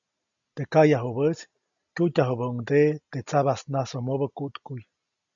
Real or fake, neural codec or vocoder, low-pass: real; none; 7.2 kHz